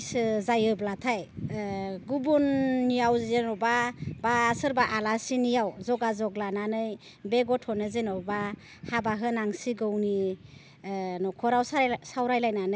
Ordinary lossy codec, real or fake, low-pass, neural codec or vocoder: none; real; none; none